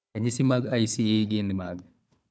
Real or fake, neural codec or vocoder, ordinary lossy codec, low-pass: fake; codec, 16 kHz, 4 kbps, FunCodec, trained on Chinese and English, 50 frames a second; none; none